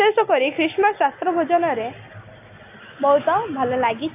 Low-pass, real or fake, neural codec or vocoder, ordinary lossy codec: 3.6 kHz; real; none; AAC, 24 kbps